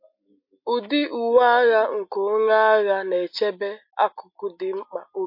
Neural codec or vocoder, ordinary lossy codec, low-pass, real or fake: none; MP3, 32 kbps; 5.4 kHz; real